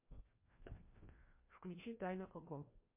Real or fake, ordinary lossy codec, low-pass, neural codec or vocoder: fake; MP3, 24 kbps; 3.6 kHz; codec, 16 kHz, 1 kbps, FreqCodec, larger model